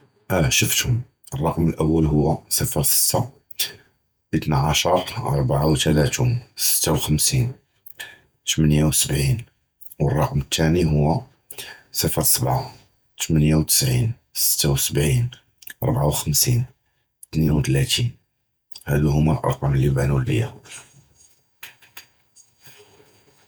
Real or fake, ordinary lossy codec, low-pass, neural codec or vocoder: fake; none; none; vocoder, 48 kHz, 128 mel bands, Vocos